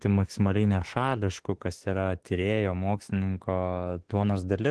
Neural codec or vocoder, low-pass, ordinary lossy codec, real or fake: autoencoder, 48 kHz, 32 numbers a frame, DAC-VAE, trained on Japanese speech; 10.8 kHz; Opus, 16 kbps; fake